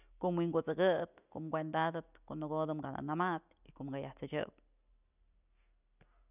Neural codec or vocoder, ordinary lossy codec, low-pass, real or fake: none; none; 3.6 kHz; real